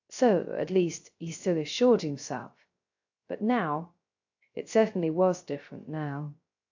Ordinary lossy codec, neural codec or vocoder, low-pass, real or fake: AAC, 48 kbps; codec, 16 kHz, 0.3 kbps, FocalCodec; 7.2 kHz; fake